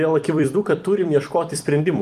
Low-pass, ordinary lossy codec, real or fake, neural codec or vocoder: 14.4 kHz; Opus, 32 kbps; fake; vocoder, 44.1 kHz, 128 mel bands every 256 samples, BigVGAN v2